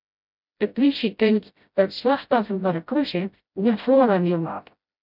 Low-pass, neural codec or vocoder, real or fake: 5.4 kHz; codec, 16 kHz, 0.5 kbps, FreqCodec, smaller model; fake